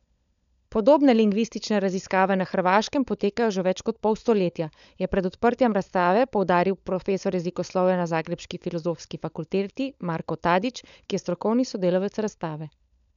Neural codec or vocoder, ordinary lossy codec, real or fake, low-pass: codec, 16 kHz, 16 kbps, FunCodec, trained on LibriTTS, 50 frames a second; none; fake; 7.2 kHz